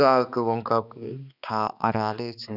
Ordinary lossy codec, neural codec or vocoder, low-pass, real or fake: none; codec, 16 kHz, 2 kbps, X-Codec, HuBERT features, trained on balanced general audio; 5.4 kHz; fake